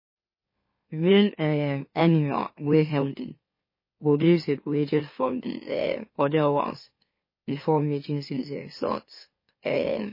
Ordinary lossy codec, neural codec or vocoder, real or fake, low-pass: MP3, 24 kbps; autoencoder, 44.1 kHz, a latent of 192 numbers a frame, MeloTTS; fake; 5.4 kHz